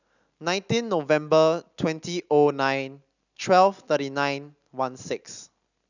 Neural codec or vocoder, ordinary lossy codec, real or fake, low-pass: none; none; real; 7.2 kHz